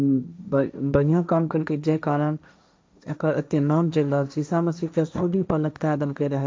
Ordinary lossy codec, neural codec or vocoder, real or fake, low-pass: none; codec, 16 kHz, 1.1 kbps, Voila-Tokenizer; fake; none